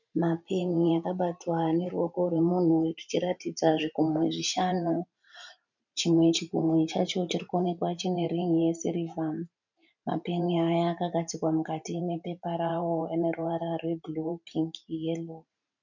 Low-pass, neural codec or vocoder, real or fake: 7.2 kHz; vocoder, 44.1 kHz, 128 mel bands every 512 samples, BigVGAN v2; fake